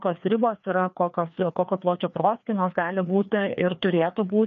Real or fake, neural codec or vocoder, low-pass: fake; codec, 16 kHz, 2 kbps, FreqCodec, larger model; 7.2 kHz